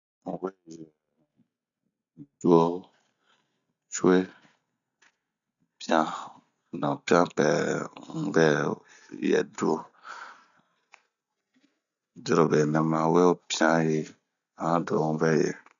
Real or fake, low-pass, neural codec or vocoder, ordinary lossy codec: real; 7.2 kHz; none; none